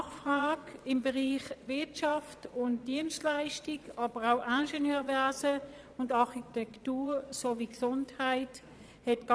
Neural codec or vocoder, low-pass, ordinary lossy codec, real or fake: vocoder, 22.05 kHz, 80 mel bands, Vocos; none; none; fake